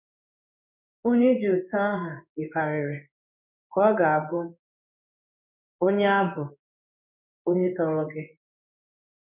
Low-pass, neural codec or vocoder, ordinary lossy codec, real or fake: 3.6 kHz; codec, 16 kHz in and 24 kHz out, 1 kbps, XY-Tokenizer; none; fake